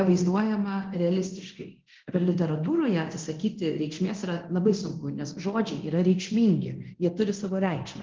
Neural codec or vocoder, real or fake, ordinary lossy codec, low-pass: codec, 24 kHz, 0.9 kbps, DualCodec; fake; Opus, 16 kbps; 7.2 kHz